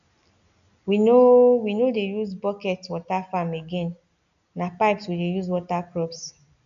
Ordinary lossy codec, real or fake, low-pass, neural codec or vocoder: none; real; 7.2 kHz; none